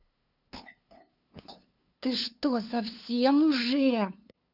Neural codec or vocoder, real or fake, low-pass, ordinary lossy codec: codec, 16 kHz, 2 kbps, FunCodec, trained on LibriTTS, 25 frames a second; fake; 5.4 kHz; none